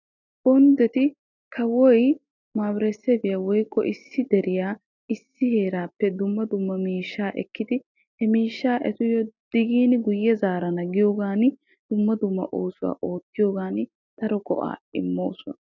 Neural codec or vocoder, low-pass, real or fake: none; 7.2 kHz; real